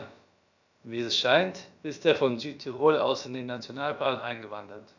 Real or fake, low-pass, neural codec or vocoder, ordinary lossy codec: fake; 7.2 kHz; codec, 16 kHz, about 1 kbps, DyCAST, with the encoder's durations; none